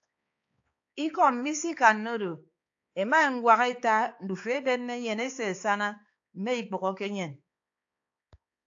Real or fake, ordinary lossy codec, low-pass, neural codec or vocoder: fake; AAC, 48 kbps; 7.2 kHz; codec, 16 kHz, 4 kbps, X-Codec, HuBERT features, trained on balanced general audio